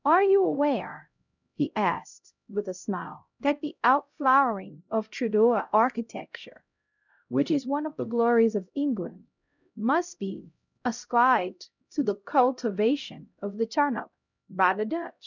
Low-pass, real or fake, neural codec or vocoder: 7.2 kHz; fake; codec, 16 kHz, 0.5 kbps, X-Codec, HuBERT features, trained on LibriSpeech